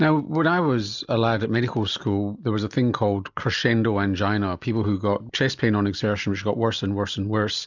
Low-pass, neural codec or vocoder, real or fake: 7.2 kHz; none; real